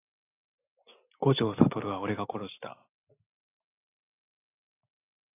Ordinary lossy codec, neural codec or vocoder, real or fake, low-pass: AAC, 24 kbps; none; real; 3.6 kHz